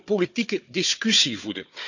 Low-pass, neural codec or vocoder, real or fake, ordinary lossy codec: 7.2 kHz; codec, 16 kHz, 4 kbps, FunCodec, trained on Chinese and English, 50 frames a second; fake; none